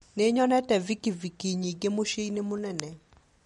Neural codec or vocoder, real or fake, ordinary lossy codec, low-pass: none; real; MP3, 48 kbps; 19.8 kHz